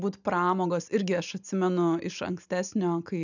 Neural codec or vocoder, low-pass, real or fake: none; 7.2 kHz; real